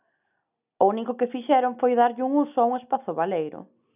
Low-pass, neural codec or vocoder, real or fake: 3.6 kHz; none; real